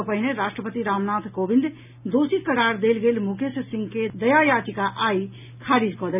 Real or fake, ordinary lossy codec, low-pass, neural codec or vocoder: real; none; 3.6 kHz; none